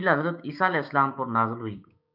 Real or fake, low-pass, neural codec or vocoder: fake; 5.4 kHz; codec, 16 kHz, 6 kbps, DAC